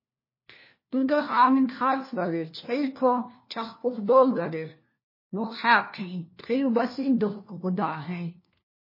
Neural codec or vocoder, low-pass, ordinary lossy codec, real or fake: codec, 16 kHz, 1 kbps, FunCodec, trained on LibriTTS, 50 frames a second; 5.4 kHz; MP3, 24 kbps; fake